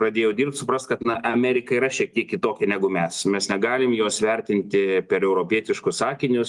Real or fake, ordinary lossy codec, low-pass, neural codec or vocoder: real; Opus, 32 kbps; 10.8 kHz; none